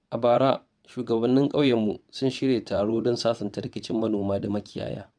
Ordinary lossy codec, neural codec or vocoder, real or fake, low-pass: none; vocoder, 22.05 kHz, 80 mel bands, WaveNeXt; fake; 9.9 kHz